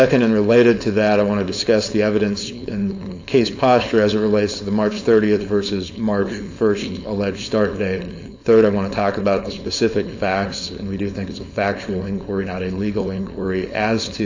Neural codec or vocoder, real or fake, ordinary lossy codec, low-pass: codec, 16 kHz, 4.8 kbps, FACodec; fake; AAC, 48 kbps; 7.2 kHz